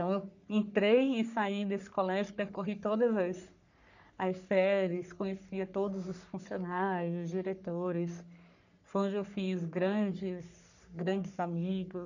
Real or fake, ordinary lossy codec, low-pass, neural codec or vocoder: fake; none; 7.2 kHz; codec, 44.1 kHz, 3.4 kbps, Pupu-Codec